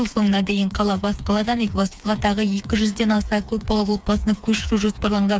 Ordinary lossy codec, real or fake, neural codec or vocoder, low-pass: none; fake; codec, 16 kHz, 4 kbps, FreqCodec, smaller model; none